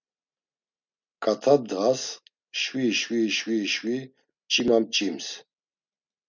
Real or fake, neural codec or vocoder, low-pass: real; none; 7.2 kHz